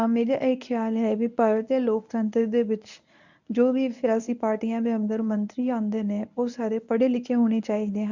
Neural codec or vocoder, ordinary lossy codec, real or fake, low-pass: codec, 24 kHz, 0.9 kbps, WavTokenizer, medium speech release version 1; none; fake; 7.2 kHz